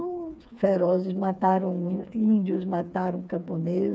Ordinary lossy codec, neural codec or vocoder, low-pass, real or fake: none; codec, 16 kHz, 4 kbps, FreqCodec, smaller model; none; fake